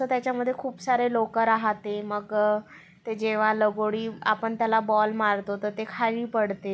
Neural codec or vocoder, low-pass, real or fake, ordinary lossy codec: none; none; real; none